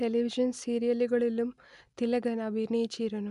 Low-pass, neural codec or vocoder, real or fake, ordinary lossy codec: 10.8 kHz; none; real; none